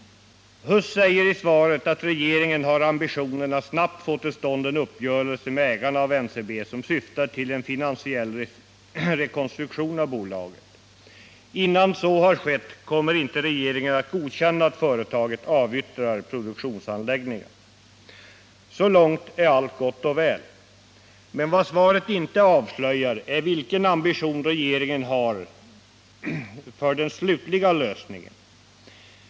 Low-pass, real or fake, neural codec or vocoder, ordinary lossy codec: none; real; none; none